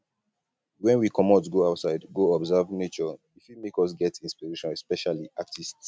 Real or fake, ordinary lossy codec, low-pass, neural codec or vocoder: real; none; none; none